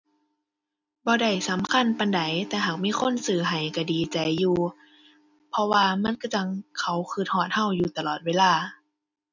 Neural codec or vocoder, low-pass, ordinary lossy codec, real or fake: none; 7.2 kHz; none; real